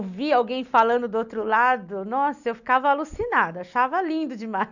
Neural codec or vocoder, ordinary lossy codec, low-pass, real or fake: none; none; 7.2 kHz; real